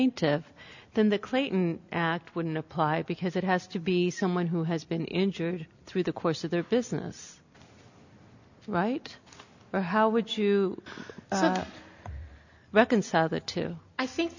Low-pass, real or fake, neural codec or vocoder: 7.2 kHz; real; none